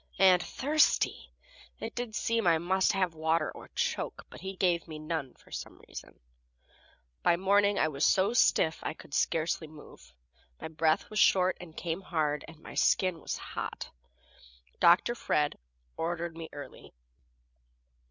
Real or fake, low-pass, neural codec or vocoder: real; 7.2 kHz; none